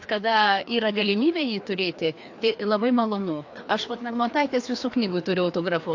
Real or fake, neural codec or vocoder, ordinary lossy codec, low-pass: fake; codec, 16 kHz, 2 kbps, FreqCodec, larger model; AAC, 48 kbps; 7.2 kHz